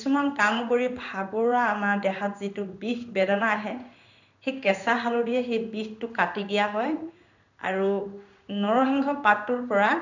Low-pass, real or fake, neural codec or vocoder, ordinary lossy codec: 7.2 kHz; fake; codec, 16 kHz in and 24 kHz out, 1 kbps, XY-Tokenizer; none